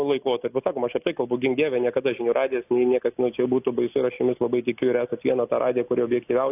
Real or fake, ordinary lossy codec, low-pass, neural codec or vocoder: real; AAC, 32 kbps; 3.6 kHz; none